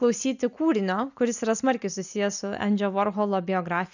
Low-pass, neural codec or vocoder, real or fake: 7.2 kHz; none; real